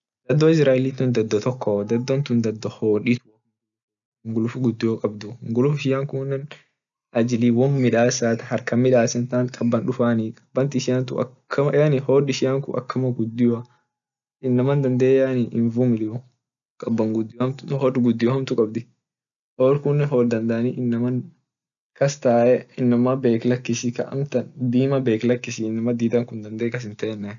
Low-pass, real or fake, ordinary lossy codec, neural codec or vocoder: 7.2 kHz; real; none; none